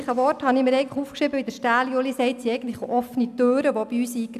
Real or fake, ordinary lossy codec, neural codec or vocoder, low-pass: real; none; none; 14.4 kHz